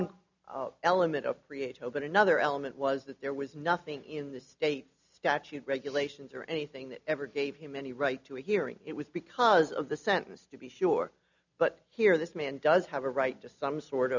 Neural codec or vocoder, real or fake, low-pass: none; real; 7.2 kHz